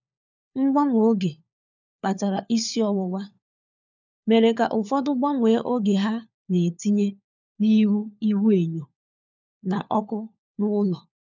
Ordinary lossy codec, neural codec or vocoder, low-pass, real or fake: none; codec, 16 kHz, 4 kbps, FunCodec, trained on LibriTTS, 50 frames a second; 7.2 kHz; fake